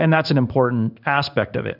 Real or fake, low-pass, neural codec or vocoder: fake; 5.4 kHz; codec, 16 kHz in and 24 kHz out, 1 kbps, XY-Tokenizer